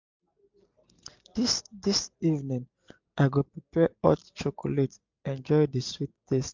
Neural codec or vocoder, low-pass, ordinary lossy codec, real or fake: none; 7.2 kHz; MP3, 64 kbps; real